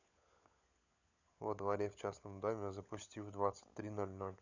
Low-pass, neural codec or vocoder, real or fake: 7.2 kHz; codec, 16 kHz, 16 kbps, FunCodec, trained on LibriTTS, 50 frames a second; fake